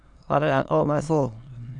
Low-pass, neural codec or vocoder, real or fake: 9.9 kHz; autoencoder, 22.05 kHz, a latent of 192 numbers a frame, VITS, trained on many speakers; fake